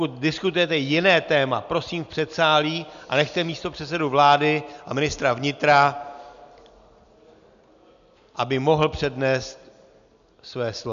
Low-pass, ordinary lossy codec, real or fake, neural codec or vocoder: 7.2 kHz; AAC, 96 kbps; real; none